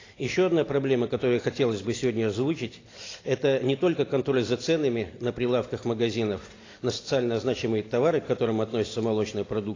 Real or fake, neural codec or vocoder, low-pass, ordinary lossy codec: real; none; 7.2 kHz; AAC, 32 kbps